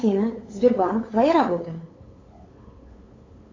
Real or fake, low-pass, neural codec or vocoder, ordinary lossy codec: fake; 7.2 kHz; codec, 16 kHz, 8 kbps, FunCodec, trained on LibriTTS, 25 frames a second; AAC, 32 kbps